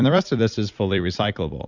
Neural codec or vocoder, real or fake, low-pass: vocoder, 44.1 kHz, 128 mel bands every 256 samples, BigVGAN v2; fake; 7.2 kHz